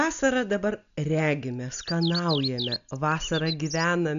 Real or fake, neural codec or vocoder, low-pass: real; none; 7.2 kHz